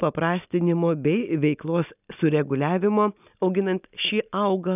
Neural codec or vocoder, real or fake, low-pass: none; real; 3.6 kHz